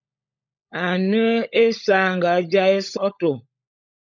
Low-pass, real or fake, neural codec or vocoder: 7.2 kHz; fake; codec, 16 kHz, 16 kbps, FunCodec, trained on LibriTTS, 50 frames a second